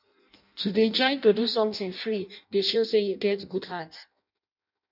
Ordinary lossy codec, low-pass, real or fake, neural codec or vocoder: none; 5.4 kHz; fake; codec, 16 kHz in and 24 kHz out, 0.6 kbps, FireRedTTS-2 codec